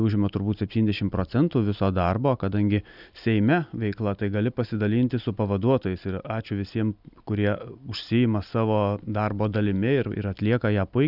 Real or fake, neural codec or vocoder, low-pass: real; none; 5.4 kHz